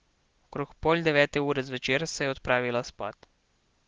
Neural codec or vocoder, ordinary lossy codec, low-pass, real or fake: none; Opus, 16 kbps; 7.2 kHz; real